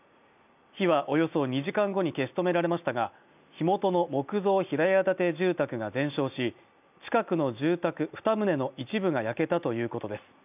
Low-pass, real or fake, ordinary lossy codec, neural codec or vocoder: 3.6 kHz; real; none; none